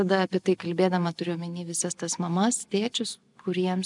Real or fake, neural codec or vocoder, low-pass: real; none; 10.8 kHz